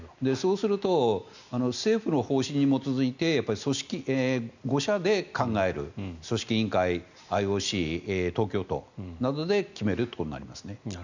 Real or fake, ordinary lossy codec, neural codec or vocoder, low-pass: real; none; none; 7.2 kHz